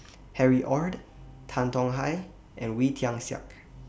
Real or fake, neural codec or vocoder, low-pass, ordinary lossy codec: real; none; none; none